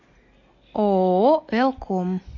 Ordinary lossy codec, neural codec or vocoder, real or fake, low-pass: MP3, 48 kbps; none; real; 7.2 kHz